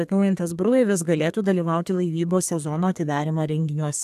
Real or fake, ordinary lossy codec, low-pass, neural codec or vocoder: fake; AAC, 96 kbps; 14.4 kHz; codec, 32 kHz, 1.9 kbps, SNAC